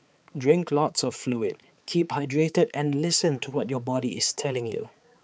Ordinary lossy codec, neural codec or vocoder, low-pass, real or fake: none; codec, 16 kHz, 4 kbps, X-Codec, HuBERT features, trained on balanced general audio; none; fake